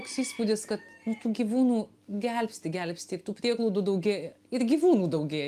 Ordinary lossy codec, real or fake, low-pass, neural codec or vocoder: Opus, 32 kbps; real; 14.4 kHz; none